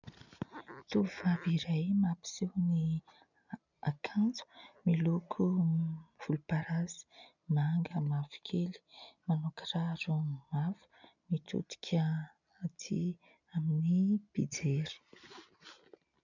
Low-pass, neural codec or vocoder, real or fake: 7.2 kHz; none; real